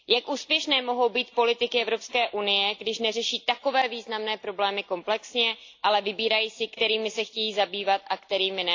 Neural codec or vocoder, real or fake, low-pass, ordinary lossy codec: none; real; 7.2 kHz; AAC, 48 kbps